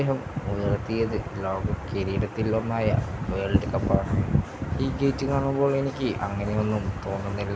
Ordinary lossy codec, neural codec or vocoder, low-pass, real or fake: none; none; none; real